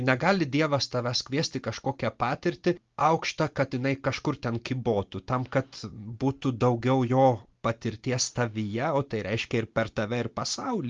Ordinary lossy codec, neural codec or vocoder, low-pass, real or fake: Opus, 32 kbps; none; 7.2 kHz; real